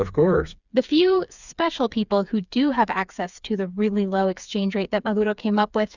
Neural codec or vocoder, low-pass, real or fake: codec, 16 kHz, 4 kbps, FreqCodec, smaller model; 7.2 kHz; fake